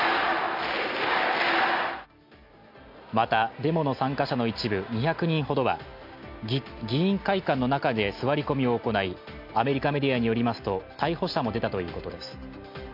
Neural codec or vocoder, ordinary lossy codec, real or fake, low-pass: none; MP3, 48 kbps; real; 5.4 kHz